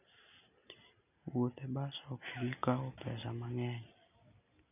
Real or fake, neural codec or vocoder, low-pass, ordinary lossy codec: real; none; 3.6 kHz; none